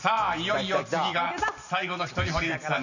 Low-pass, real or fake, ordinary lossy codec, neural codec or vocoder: 7.2 kHz; real; none; none